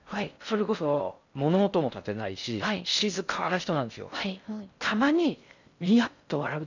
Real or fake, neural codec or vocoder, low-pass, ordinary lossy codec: fake; codec, 16 kHz in and 24 kHz out, 0.6 kbps, FocalCodec, streaming, 4096 codes; 7.2 kHz; none